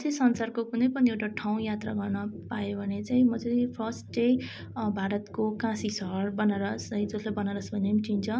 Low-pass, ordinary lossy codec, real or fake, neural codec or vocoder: none; none; real; none